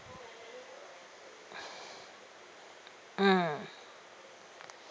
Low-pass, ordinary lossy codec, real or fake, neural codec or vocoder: none; none; real; none